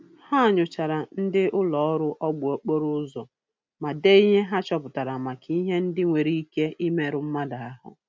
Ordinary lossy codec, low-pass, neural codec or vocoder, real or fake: none; none; none; real